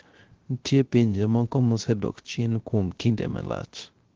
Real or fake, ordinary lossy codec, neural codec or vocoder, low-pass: fake; Opus, 16 kbps; codec, 16 kHz, 0.3 kbps, FocalCodec; 7.2 kHz